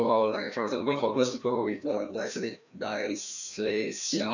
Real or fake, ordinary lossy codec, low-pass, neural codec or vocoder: fake; none; 7.2 kHz; codec, 16 kHz, 1 kbps, FreqCodec, larger model